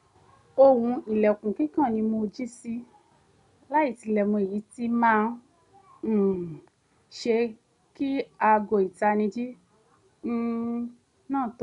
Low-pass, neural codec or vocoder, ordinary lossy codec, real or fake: 10.8 kHz; none; none; real